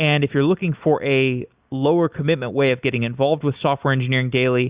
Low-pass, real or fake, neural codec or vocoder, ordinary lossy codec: 3.6 kHz; real; none; Opus, 64 kbps